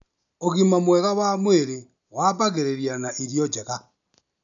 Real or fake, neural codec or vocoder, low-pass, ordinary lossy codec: real; none; 7.2 kHz; none